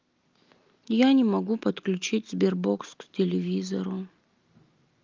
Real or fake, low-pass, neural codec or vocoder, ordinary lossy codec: real; 7.2 kHz; none; Opus, 24 kbps